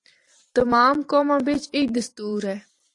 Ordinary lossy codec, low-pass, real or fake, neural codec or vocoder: AAC, 48 kbps; 10.8 kHz; fake; vocoder, 44.1 kHz, 128 mel bands every 512 samples, BigVGAN v2